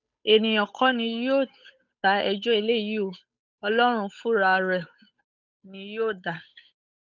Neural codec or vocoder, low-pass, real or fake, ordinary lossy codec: codec, 16 kHz, 8 kbps, FunCodec, trained on Chinese and English, 25 frames a second; 7.2 kHz; fake; none